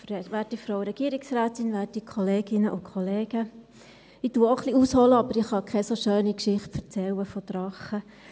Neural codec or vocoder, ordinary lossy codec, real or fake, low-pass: none; none; real; none